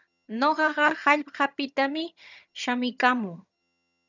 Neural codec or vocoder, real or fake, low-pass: vocoder, 22.05 kHz, 80 mel bands, HiFi-GAN; fake; 7.2 kHz